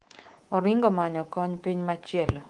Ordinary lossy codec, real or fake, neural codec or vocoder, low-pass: Opus, 24 kbps; fake; codec, 24 kHz, 3.1 kbps, DualCodec; 10.8 kHz